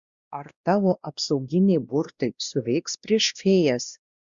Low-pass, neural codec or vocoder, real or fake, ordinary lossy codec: 7.2 kHz; codec, 16 kHz, 1 kbps, X-Codec, WavLM features, trained on Multilingual LibriSpeech; fake; Opus, 64 kbps